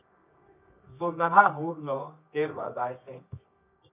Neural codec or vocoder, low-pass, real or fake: codec, 24 kHz, 0.9 kbps, WavTokenizer, medium music audio release; 3.6 kHz; fake